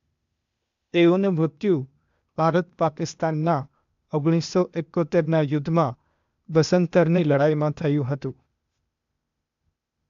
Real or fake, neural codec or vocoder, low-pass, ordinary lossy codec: fake; codec, 16 kHz, 0.8 kbps, ZipCodec; 7.2 kHz; MP3, 64 kbps